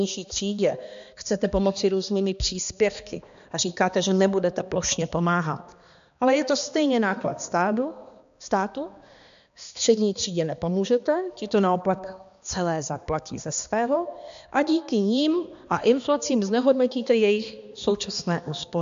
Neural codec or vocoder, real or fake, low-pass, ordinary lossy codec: codec, 16 kHz, 2 kbps, X-Codec, HuBERT features, trained on balanced general audio; fake; 7.2 kHz; MP3, 64 kbps